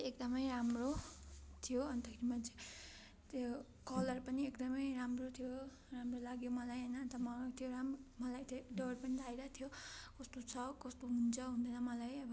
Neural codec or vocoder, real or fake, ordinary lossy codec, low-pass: none; real; none; none